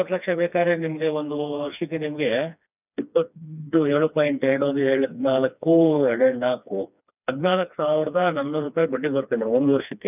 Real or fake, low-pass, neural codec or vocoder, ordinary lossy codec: fake; 3.6 kHz; codec, 16 kHz, 2 kbps, FreqCodec, smaller model; none